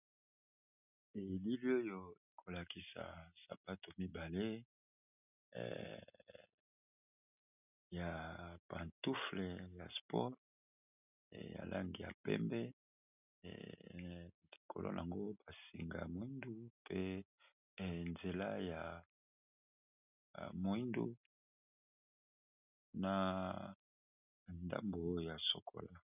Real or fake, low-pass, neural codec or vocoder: real; 3.6 kHz; none